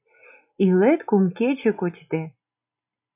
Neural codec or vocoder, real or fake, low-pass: none; real; 3.6 kHz